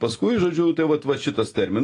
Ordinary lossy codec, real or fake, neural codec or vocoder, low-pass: AAC, 32 kbps; real; none; 10.8 kHz